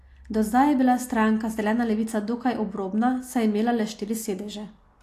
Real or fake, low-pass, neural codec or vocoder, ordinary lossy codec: real; 14.4 kHz; none; AAC, 64 kbps